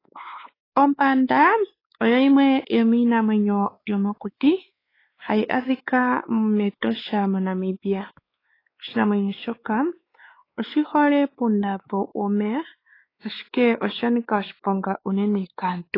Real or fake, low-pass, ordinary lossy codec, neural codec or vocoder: fake; 5.4 kHz; AAC, 24 kbps; codec, 16 kHz, 4 kbps, X-Codec, WavLM features, trained on Multilingual LibriSpeech